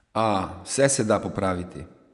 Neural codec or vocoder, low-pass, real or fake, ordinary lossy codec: vocoder, 24 kHz, 100 mel bands, Vocos; 10.8 kHz; fake; none